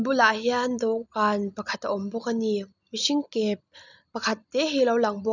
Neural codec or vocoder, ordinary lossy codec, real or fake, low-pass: none; none; real; 7.2 kHz